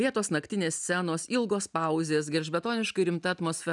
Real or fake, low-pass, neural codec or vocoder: real; 10.8 kHz; none